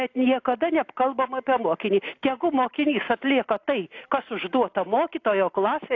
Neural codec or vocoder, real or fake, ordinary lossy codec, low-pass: none; real; AAC, 48 kbps; 7.2 kHz